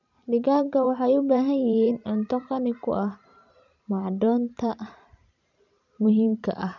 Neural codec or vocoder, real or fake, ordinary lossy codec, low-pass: vocoder, 44.1 kHz, 128 mel bands every 512 samples, BigVGAN v2; fake; none; 7.2 kHz